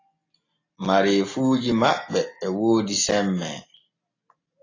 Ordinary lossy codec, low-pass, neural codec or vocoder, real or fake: AAC, 32 kbps; 7.2 kHz; none; real